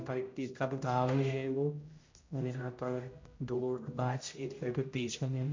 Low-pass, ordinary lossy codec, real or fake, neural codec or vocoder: 7.2 kHz; MP3, 48 kbps; fake; codec, 16 kHz, 0.5 kbps, X-Codec, HuBERT features, trained on general audio